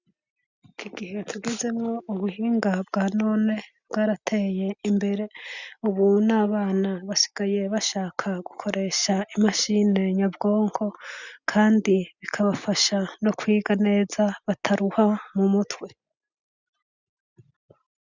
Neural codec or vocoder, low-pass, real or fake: none; 7.2 kHz; real